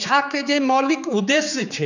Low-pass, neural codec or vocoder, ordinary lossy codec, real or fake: 7.2 kHz; codec, 16 kHz, 4 kbps, X-Codec, HuBERT features, trained on general audio; none; fake